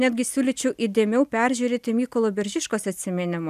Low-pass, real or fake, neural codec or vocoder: 14.4 kHz; real; none